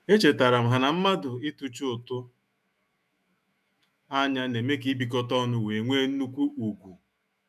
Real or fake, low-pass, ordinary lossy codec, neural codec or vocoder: fake; 14.4 kHz; none; autoencoder, 48 kHz, 128 numbers a frame, DAC-VAE, trained on Japanese speech